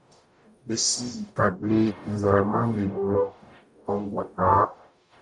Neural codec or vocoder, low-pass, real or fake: codec, 44.1 kHz, 0.9 kbps, DAC; 10.8 kHz; fake